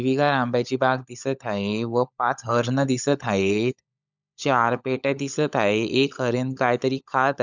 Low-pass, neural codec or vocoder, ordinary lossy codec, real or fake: 7.2 kHz; codec, 16 kHz, 16 kbps, FunCodec, trained on LibriTTS, 50 frames a second; none; fake